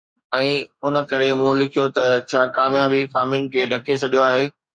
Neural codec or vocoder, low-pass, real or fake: codec, 44.1 kHz, 2.6 kbps, DAC; 9.9 kHz; fake